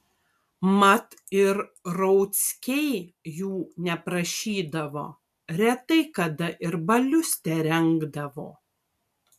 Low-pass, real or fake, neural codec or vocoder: 14.4 kHz; real; none